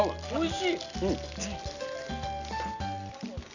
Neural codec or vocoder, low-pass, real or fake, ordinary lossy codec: none; 7.2 kHz; real; none